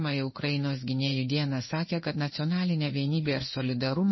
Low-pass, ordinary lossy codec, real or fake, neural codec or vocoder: 7.2 kHz; MP3, 24 kbps; fake; codec, 16 kHz in and 24 kHz out, 1 kbps, XY-Tokenizer